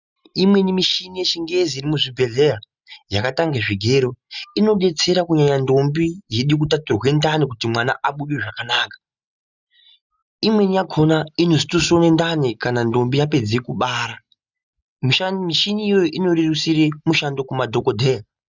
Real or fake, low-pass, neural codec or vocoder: real; 7.2 kHz; none